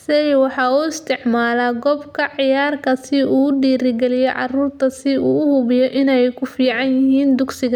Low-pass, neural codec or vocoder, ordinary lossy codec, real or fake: 19.8 kHz; none; none; real